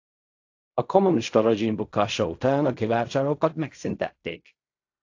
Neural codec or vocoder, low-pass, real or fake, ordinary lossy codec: codec, 16 kHz in and 24 kHz out, 0.4 kbps, LongCat-Audio-Codec, fine tuned four codebook decoder; 7.2 kHz; fake; AAC, 48 kbps